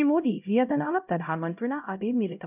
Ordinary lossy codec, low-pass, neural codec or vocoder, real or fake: none; 3.6 kHz; codec, 16 kHz, 0.5 kbps, X-Codec, HuBERT features, trained on LibriSpeech; fake